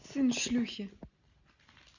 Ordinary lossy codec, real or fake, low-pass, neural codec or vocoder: Opus, 64 kbps; real; 7.2 kHz; none